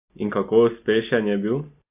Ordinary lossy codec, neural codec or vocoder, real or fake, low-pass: AAC, 32 kbps; none; real; 3.6 kHz